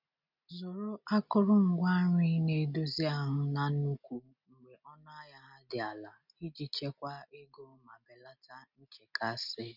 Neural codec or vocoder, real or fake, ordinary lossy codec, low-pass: none; real; none; 5.4 kHz